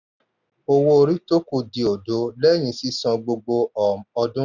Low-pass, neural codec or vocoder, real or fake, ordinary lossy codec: 7.2 kHz; none; real; none